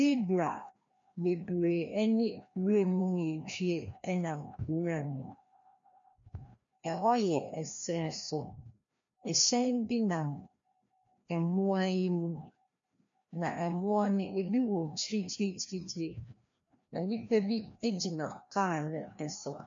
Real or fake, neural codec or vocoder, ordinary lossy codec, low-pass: fake; codec, 16 kHz, 1 kbps, FreqCodec, larger model; MP3, 48 kbps; 7.2 kHz